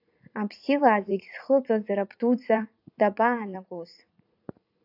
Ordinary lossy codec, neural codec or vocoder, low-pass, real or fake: AAC, 48 kbps; vocoder, 44.1 kHz, 80 mel bands, Vocos; 5.4 kHz; fake